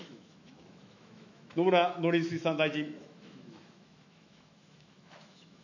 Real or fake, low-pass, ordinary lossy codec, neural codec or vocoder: fake; 7.2 kHz; none; autoencoder, 48 kHz, 128 numbers a frame, DAC-VAE, trained on Japanese speech